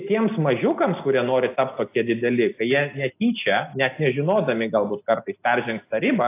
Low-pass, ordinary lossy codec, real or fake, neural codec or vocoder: 3.6 kHz; AAC, 24 kbps; real; none